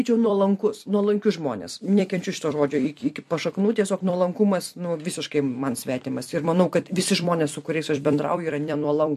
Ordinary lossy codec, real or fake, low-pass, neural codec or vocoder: MP3, 64 kbps; fake; 14.4 kHz; vocoder, 44.1 kHz, 128 mel bands every 256 samples, BigVGAN v2